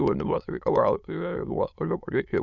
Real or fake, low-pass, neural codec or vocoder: fake; 7.2 kHz; autoencoder, 22.05 kHz, a latent of 192 numbers a frame, VITS, trained on many speakers